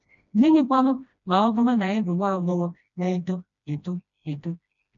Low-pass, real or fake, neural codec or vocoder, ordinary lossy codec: 7.2 kHz; fake; codec, 16 kHz, 1 kbps, FreqCodec, smaller model; none